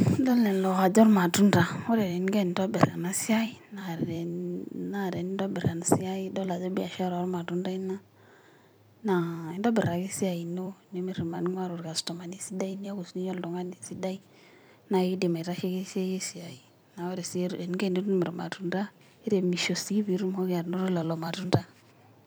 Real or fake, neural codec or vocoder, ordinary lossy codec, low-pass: fake; vocoder, 44.1 kHz, 128 mel bands every 256 samples, BigVGAN v2; none; none